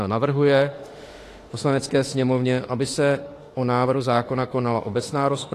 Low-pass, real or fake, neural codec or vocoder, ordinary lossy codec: 14.4 kHz; fake; autoencoder, 48 kHz, 32 numbers a frame, DAC-VAE, trained on Japanese speech; AAC, 48 kbps